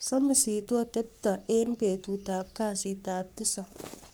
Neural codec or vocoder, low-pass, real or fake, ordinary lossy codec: codec, 44.1 kHz, 3.4 kbps, Pupu-Codec; none; fake; none